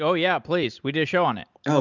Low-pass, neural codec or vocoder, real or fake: 7.2 kHz; none; real